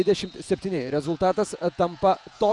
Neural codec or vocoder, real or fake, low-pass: vocoder, 48 kHz, 128 mel bands, Vocos; fake; 10.8 kHz